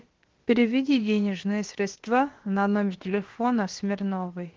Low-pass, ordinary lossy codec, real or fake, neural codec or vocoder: 7.2 kHz; Opus, 16 kbps; fake; codec, 16 kHz, about 1 kbps, DyCAST, with the encoder's durations